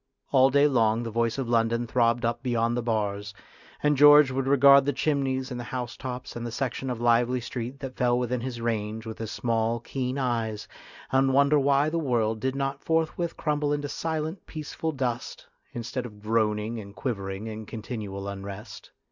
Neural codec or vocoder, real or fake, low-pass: none; real; 7.2 kHz